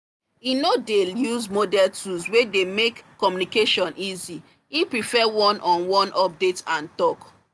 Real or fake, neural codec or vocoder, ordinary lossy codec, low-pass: real; none; none; none